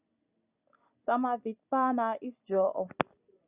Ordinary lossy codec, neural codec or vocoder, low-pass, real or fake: Opus, 64 kbps; none; 3.6 kHz; real